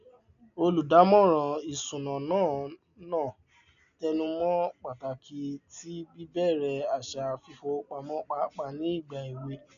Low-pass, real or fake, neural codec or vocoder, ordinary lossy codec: 7.2 kHz; real; none; none